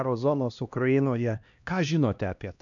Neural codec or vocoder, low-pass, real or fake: codec, 16 kHz, 1 kbps, X-Codec, HuBERT features, trained on LibriSpeech; 7.2 kHz; fake